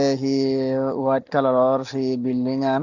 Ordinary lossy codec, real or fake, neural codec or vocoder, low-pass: Opus, 64 kbps; real; none; 7.2 kHz